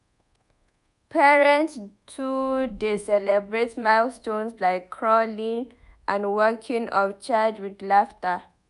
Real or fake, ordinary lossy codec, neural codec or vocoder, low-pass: fake; none; codec, 24 kHz, 1.2 kbps, DualCodec; 10.8 kHz